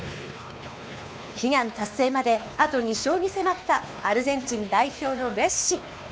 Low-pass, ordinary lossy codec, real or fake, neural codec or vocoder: none; none; fake; codec, 16 kHz, 2 kbps, X-Codec, WavLM features, trained on Multilingual LibriSpeech